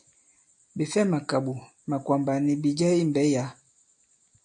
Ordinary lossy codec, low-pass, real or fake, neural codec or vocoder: AAC, 64 kbps; 9.9 kHz; real; none